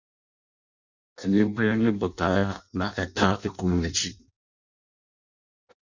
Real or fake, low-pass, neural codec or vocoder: fake; 7.2 kHz; codec, 16 kHz in and 24 kHz out, 0.6 kbps, FireRedTTS-2 codec